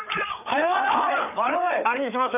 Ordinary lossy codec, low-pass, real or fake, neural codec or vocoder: none; 3.6 kHz; fake; codec, 16 kHz, 4 kbps, FreqCodec, larger model